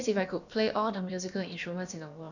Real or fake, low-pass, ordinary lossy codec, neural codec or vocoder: fake; 7.2 kHz; none; codec, 16 kHz, about 1 kbps, DyCAST, with the encoder's durations